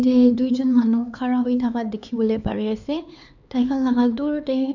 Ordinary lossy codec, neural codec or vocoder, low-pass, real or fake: none; codec, 16 kHz, 4 kbps, X-Codec, HuBERT features, trained on LibriSpeech; 7.2 kHz; fake